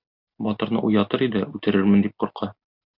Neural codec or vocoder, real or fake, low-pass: none; real; 5.4 kHz